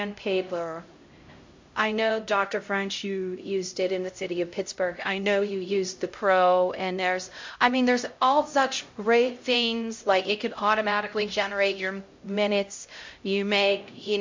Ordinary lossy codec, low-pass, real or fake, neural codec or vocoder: MP3, 48 kbps; 7.2 kHz; fake; codec, 16 kHz, 0.5 kbps, X-Codec, HuBERT features, trained on LibriSpeech